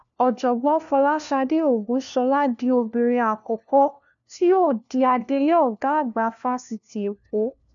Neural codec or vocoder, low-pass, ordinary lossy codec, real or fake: codec, 16 kHz, 1 kbps, FunCodec, trained on LibriTTS, 50 frames a second; 7.2 kHz; none; fake